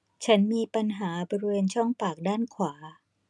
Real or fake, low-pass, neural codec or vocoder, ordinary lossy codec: real; none; none; none